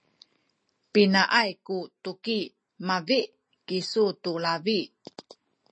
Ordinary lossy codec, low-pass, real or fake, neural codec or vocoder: MP3, 32 kbps; 9.9 kHz; real; none